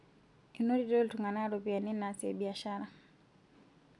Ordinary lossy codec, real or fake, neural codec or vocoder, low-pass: none; real; none; 10.8 kHz